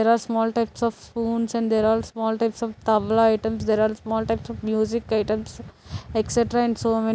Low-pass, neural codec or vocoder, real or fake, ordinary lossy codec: none; none; real; none